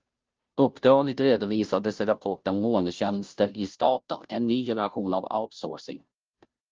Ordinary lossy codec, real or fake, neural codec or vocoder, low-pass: Opus, 24 kbps; fake; codec, 16 kHz, 0.5 kbps, FunCodec, trained on Chinese and English, 25 frames a second; 7.2 kHz